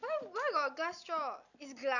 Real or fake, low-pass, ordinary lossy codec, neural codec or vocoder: real; 7.2 kHz; none; none